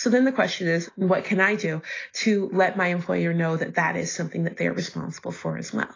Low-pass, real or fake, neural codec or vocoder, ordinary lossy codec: 7.2 kHz; real; none; AAC, 32 kbps